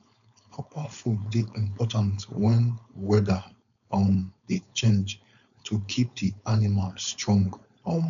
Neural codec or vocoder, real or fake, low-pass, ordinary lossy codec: codec, 16 kHz, 4.8 kbps, FACodec; fake; 7.2 kHz; MP3, 96 kbps